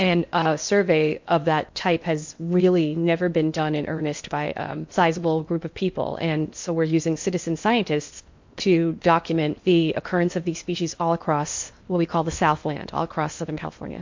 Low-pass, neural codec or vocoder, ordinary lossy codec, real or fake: 7.2 kHz; codec, 16 kHz in and 24 kHz out, 0.8 kbps, FocalCodec, streaming, 65536 codes; MP3, 48 kbps; fake